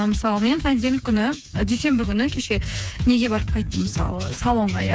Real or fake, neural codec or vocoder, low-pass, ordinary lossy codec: fake; codec, 16 kHz, 4 kbps, FreqCodec, smaller model; none; none